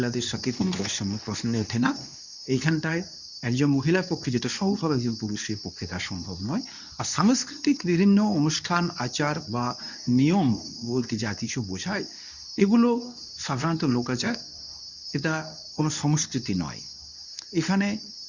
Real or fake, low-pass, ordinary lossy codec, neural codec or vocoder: fake; 7.2 kHz; none; codec, 24 kHz, 0.9 kbps, WavTokenizer, medium speech release version 2